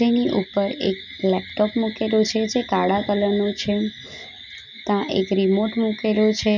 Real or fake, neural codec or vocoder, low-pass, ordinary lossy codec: real; none; 7.2 kHz; none